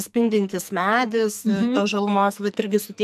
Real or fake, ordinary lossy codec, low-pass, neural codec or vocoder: fake; AAC, 96 kbps; 14.4 kHz; codec, 44.1 kHz, 2.6 kbps, SNAC